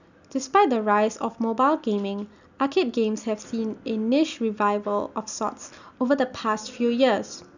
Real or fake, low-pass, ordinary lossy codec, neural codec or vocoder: real; 7.2 kHz; none; none